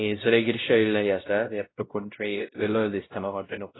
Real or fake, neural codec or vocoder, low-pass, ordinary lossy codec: fake; codec, 16 kHz, 0.5 kbps, X-Codec, WavLM features, trained on Multilingual LibriSpeech; 7.2 kHz; AAC, 16 kbps